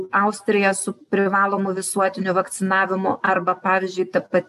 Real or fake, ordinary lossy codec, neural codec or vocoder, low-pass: real; AAC, 96 kbps; none; 14.4 kHz